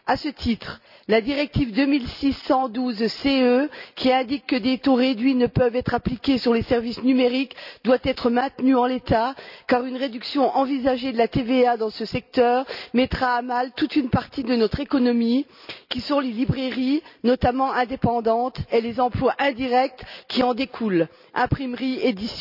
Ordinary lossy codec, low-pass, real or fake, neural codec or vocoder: none; 5.4 kHz; real; none